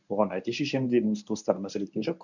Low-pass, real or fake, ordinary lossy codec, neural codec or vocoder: 7.2 kHz; fake; none; codec, 24 kHz, 0.9 kbps, WavTokenizer, medium speech release version 1